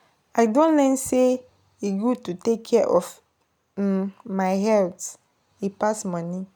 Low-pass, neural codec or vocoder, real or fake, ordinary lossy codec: none; none; real; none